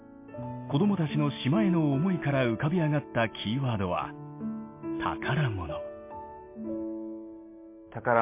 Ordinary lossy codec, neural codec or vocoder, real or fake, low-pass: MP3, 24 kbps; none; real; 3.6 kHz